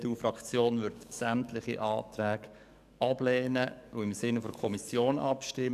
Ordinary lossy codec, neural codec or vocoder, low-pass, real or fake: none; codec, 44.1 kHz, 7.8 kbps, DAC; 14.4 kHz; fake